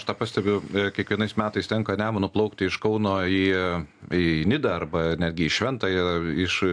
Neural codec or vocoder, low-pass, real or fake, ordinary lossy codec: none; 9.9 kHz; real; AAC, 64 kbps